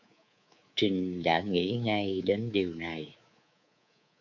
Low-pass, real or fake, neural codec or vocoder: 7.2 kHz; fake; codec, 24 kHz, 3.1 kbps, DualCodec